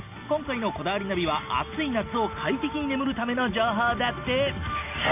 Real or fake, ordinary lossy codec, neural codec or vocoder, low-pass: real; none; none; 3.6 kHz